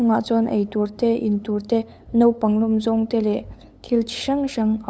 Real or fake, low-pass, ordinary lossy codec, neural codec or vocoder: fake; none; none; codec, 16 kHz, 8 kbps, FunCodec, trained on LibriTTS, 25 frames a second